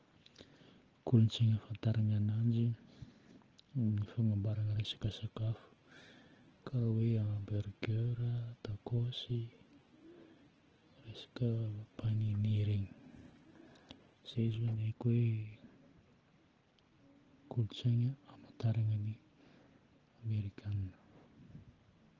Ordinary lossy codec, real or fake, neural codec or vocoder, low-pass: Opus, 16 kbps; real; none; 7.2 kHz